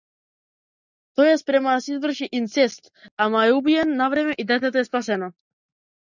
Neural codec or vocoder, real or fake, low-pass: none; real; 7.2 kHz